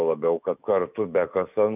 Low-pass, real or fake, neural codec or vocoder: 3.6 kHz; fake; autoencoder, 48 kHz, 128 numbers a frame, DAC-VAE, trained on Japanese speech